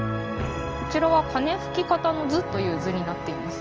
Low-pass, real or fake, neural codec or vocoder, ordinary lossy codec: 7.2 kHz; real; none; Opus, 24 kbps